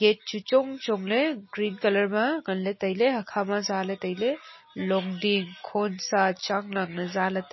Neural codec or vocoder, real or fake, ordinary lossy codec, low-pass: none; real; MP3, 24 kbps; 7.2 kHz